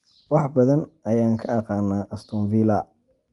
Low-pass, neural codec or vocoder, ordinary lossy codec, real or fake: 10.8 kHz; none; Opus, 32 kbps; real